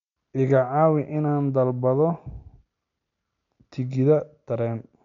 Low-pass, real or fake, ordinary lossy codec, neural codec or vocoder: 7.2 kHz; real; MP3, 96 kbps; none